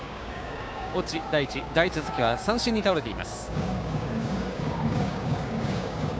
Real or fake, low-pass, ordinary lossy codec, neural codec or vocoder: fake; none; none; codec, 16 kHz, 6 kbps, DAC